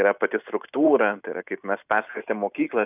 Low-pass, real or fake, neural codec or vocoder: 3.6 kHz; real; none